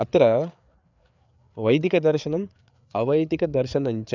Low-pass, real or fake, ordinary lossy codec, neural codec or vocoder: 7.2 kHz; fake; none; codec, 16 kHz, 4 kbps, FreqCodec, larger model